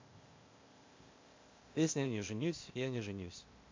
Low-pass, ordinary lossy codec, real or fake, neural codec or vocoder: 7.2 kHz; MP3, 48 kbps; fake; codec, 16 kHz, 0.8 kbps, ZipCodec